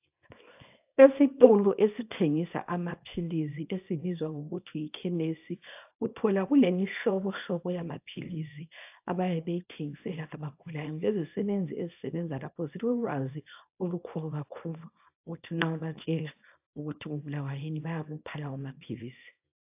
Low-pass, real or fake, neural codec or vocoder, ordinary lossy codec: 3.6 kHz; fake; codec, 24 kHz, 0.9 kbps, WavTokenizer, small release; AAC, 32 kbps